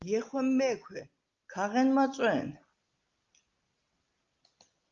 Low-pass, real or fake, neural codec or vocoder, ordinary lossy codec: 7.2 kHz; real; none; Opus, 24 kbps